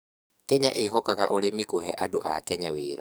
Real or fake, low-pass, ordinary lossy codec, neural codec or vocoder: fake; none; none; codec, 44.1 kHz, 2.6 kbps, SNAC